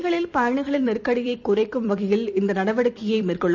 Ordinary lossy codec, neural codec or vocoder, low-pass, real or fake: none; vocoder, 44.1 kHz, 128 mel bands every 256 samples, BigVGAN v2; 7.2 kHz; fake